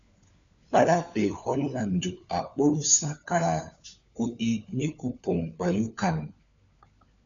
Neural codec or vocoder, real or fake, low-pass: codec, 16 kHz, 4 kbps, FunCodec, trained on LibriTTS, 50 frames a second; fake; 7.2 kHz